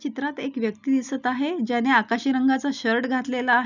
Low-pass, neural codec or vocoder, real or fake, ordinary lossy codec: 7.2 kHz; none; real; none